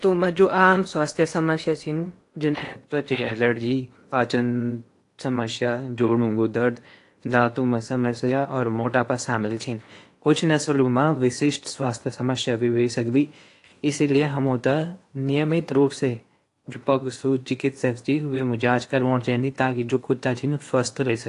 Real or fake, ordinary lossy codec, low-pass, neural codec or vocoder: fake; AAC, 48 kbps; 10.8 kHz; codec, 16 kHz in and 24 kHz out, 0.8 kbps, FocalCodec, streaming, 65536 codes